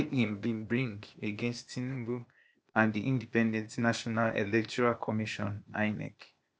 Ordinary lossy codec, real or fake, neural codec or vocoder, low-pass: none; fake; codec, 16 kHz, 0.8 kbps, ZipCodec; none